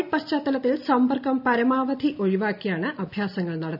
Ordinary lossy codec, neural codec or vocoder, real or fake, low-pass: none; none; real; 5.4 kHz